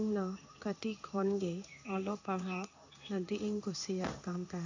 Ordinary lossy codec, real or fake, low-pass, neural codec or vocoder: AAC, 48 kbps; fake; 7.2 kHz; codec, 16 kHz in and 24 kHz out, 1 kbps, XY-Tokenizer